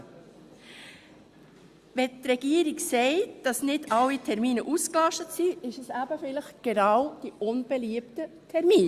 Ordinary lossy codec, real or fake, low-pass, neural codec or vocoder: Opus, 64 kbps; real; 14.4 kHz; none